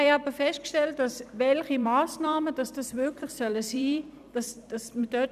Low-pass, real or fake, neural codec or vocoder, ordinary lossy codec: 14.4 kHz; fake; vocoder, 44.1 kHz, 128 mel bands every 256 samples, BigVGAN v2; none